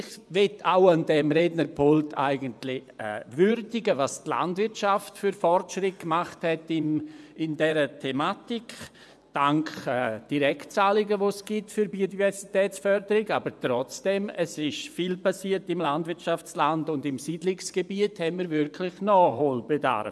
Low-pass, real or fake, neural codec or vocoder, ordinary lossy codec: none; fake; vocoder, 24 kHz, 100 mel bands, Vocos; none